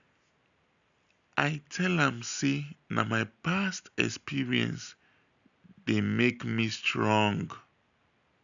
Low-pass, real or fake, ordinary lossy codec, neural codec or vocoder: 7.2 kHz; real; none; none